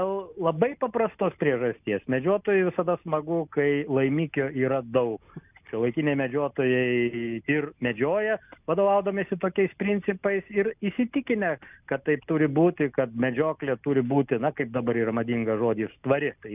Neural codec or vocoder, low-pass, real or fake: none; 3.6 kHz; real